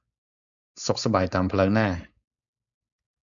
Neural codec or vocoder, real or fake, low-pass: codec, 16 kHz, 4.8 kbps, FACodec; fake; 7.2 kHz